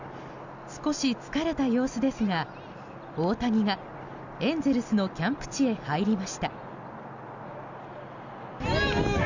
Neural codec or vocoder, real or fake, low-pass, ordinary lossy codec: none; real; 7.2 kHz; none